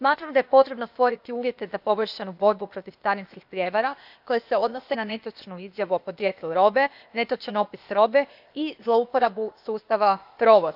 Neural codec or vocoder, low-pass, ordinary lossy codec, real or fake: codec, 16 kHz, 0.8 kbps, ZipCodec; 5.4 kHz; none; fake